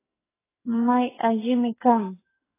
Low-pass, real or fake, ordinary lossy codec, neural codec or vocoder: 3.6 kHz; fake; AAC, 16 kbps; codec, 44.1 kHz, 2.6 kbps, SNAC